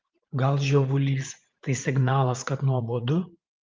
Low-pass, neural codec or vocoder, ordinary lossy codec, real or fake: 7.2 kHz; vocoder, 44.1 kHz, 80 mel bands, Vocos; Opus, 32 kbps; fake